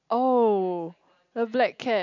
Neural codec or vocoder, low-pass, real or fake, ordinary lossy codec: none; 7.2 kHz; real; none